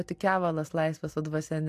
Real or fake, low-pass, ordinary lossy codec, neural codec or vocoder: real; 14.4 kHz; AAC, 64 kbps; none